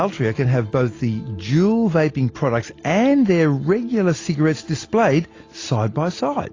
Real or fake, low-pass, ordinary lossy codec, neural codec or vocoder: real; 7.2 kHz; AAC, 32 kbps; none